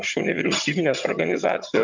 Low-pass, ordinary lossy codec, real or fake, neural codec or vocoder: 7.2 kHz; MP3, 64 kbps; fake; vocoder, 22.05 kHz, 80 mel bands, HiFi-GAN